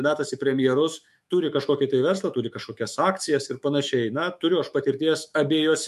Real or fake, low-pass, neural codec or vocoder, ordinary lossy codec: fake; 14.4 kHz; autoencoder, 48 kHz, 128 numbers a frame, DAC-VAE, trained on Japanese speech; MP3, 64 kbps